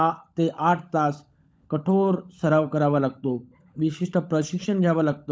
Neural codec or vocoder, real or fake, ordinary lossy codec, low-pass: codec, 16 kHz, 16 kbps, FunCodec, trained on LibriTTS, 50 frames a second; fake; none; none